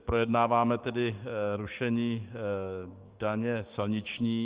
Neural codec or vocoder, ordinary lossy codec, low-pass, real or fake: codec, 16 kHz, 6 kbps, DAC; Opus, 24 kbps; 3.6 kHz; fake